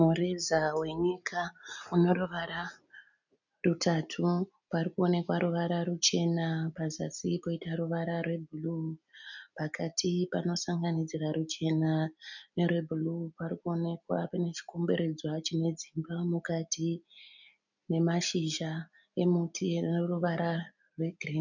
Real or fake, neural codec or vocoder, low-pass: real; none; 7.2 kHz